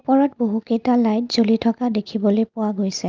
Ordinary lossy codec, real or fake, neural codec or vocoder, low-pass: Opus, 24 kbps; real; none; 7.2 kHz